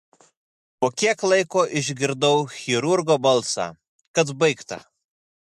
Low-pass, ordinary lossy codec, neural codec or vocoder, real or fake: 14.4 kHz; MP3, 64 kbps; none; real